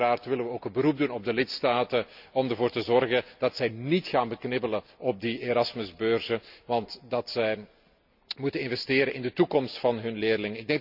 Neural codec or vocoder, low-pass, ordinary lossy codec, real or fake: none; 5.4 kHz; none; real